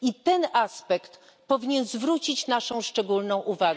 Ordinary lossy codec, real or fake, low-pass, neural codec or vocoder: none; real; none; none